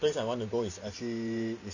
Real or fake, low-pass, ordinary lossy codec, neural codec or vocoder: fake; 7.2 kHz; none; vocoder, 44.1 kHz, 128 mel bands every 512 samples, BigVGAN v2